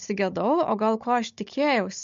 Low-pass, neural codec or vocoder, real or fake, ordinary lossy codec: 7.2 kHz; codec, 16 kHz, 16 kbps, FunCodec, trained on Chinese and English, 50 frames a second; fake; MP3, 48 kbps